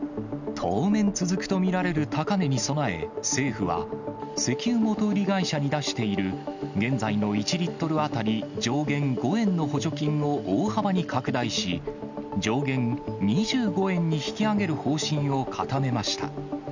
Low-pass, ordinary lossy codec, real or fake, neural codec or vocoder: 7.2 kHz; none; real; none